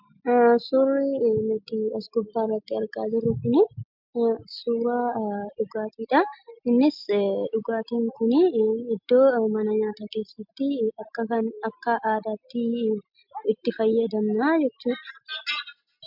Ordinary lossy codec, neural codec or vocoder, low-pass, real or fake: MP3, 48 kbps; none; 5.4 kHz; real